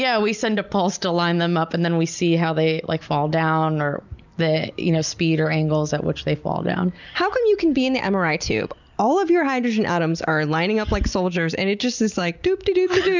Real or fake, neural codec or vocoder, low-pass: real; none; 7.2 kHz